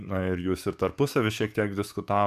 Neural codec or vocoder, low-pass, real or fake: codec, 44.1 kHz, 7.8 kbps, DAC; 14.4 kHz; fake